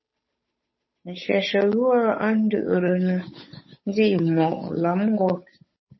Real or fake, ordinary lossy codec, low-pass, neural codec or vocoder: fake; MP3, 24 kbps; 7.2 kHz; codec, 16 kHz, 8 kbps, FunCodec, trained on Chinese and English, 25 frames a second